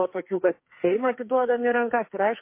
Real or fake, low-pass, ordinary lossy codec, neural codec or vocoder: fake; 3.6 kHz; MP3, 24 kbps; codec, 44.1 kHz, 2.6 kbps, SNAC